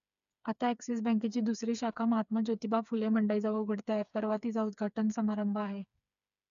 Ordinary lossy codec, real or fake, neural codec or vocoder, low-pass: none; fake; codec, 16 kHz, 4 kbps, FreqCodec, smaller model; 7.2 kHz